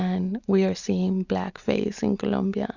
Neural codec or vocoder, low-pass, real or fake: none; 7.2 kHz; real